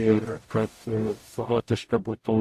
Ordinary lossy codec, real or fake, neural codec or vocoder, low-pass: AAC, 64 kbps; fake; codec, 44.1 kHz, 0.9 kbps, DAC; 14.4 kHz